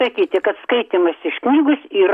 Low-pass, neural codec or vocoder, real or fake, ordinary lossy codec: 14.4 kHz; none; real; AAC, 96 kbps